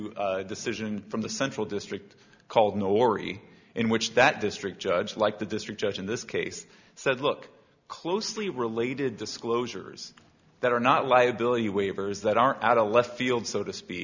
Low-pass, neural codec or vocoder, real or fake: 7.2 kHz; none; real